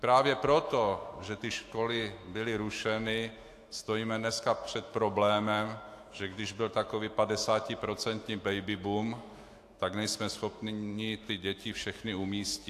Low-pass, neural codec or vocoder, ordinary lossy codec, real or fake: 14.4 kHz; autoencoder, 48 kHz, 128 numbers a frame, DAC-VAE, trained on Japanese speech; AAC, 64 kbps; fake